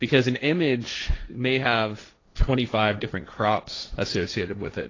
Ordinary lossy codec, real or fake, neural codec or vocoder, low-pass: AAC, 32 kbps; fake; codec, 16 kHz, 1.1 kbps, Voila-Tokenizer; 7.2 kHz